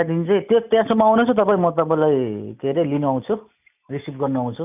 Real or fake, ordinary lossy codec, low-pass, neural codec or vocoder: real; none; 3.6 kHz; none